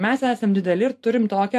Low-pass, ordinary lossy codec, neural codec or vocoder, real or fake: 14.4 kHz; AAC, 64 kbps; vocoder, 44.1 kHz, 128 mel bands every 512 samples, BigVGAN v2; fake